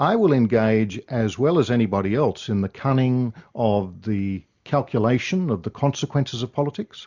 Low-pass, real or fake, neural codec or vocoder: 7.2 kHz; real; none